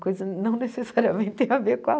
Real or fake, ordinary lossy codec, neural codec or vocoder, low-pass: real; none; none; none